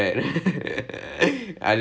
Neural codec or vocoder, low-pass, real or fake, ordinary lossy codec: none; none; real; none